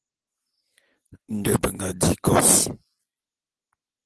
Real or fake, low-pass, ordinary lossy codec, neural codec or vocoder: real; 10.8 kHz; Opus, 16 kbps; none